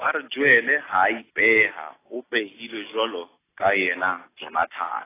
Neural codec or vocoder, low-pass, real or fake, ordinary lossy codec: none; 3.6 kHz; real; AAC, 16 kbps